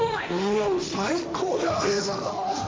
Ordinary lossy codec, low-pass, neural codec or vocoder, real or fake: none; none; codec, 16 kHz, 1.1 kbps, Voila-Tokenizer; fake